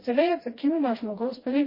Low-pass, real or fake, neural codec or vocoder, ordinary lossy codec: 5.4 kHz; fake; codec, 16 kHz, 1 kbps, FreqCodec, smaller model; MP3, 24 kbps